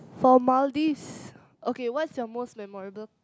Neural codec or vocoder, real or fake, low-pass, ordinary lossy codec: none; real; none; none